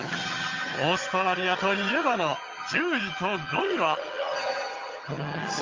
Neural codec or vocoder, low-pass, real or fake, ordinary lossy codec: vocoder, 22.05 kHz, 80 mel bands, HiFi-GAN; 7.2 kHz; fake; Opus, 32 kbps